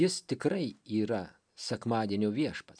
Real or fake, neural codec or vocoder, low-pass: real; none; 9.9 kHz